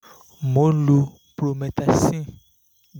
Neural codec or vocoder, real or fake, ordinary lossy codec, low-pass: none; real; none; none